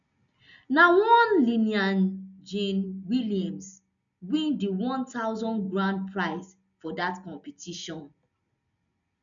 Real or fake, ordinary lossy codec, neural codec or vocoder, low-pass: real; none; none; 7.2 kHz